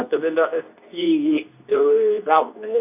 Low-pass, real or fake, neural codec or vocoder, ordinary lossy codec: 3.6 kHz; fake; codec, 24 kHz, 0.9 kbps, WavTokenizer, medium speech release version 2; none